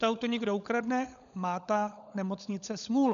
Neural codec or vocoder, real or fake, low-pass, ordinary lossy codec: codec, 16 kHz, 16 kbps, FunCodec, trained on LibriTTS, 50 frames a second; fake; 7.2 kHz; MP3, 96 kbps